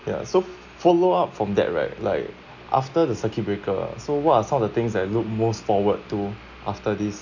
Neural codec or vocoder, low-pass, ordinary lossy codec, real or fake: none; 7.2 kHz; none; real